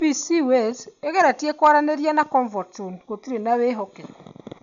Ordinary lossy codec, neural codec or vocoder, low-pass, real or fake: none; none; 7.2 kHz; real